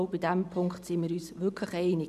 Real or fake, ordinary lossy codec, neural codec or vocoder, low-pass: fake; none; vocoder, 44.1 kHz, 128 mel bands every 512 samples, BigVGAN v2; 14.4 kHz